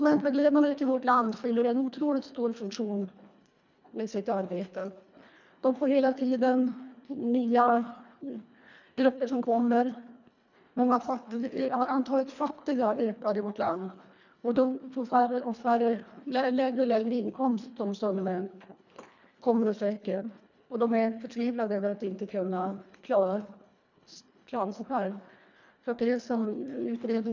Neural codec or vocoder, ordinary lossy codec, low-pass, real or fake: codec, 24 kHz, 1.5 kbps, HILCodec; none; 7.2 kHz; fake